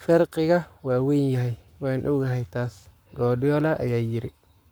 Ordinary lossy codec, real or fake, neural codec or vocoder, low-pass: none; fake; codec, 44.1 kHz, 7.8 kbps, Pupu-Codec; none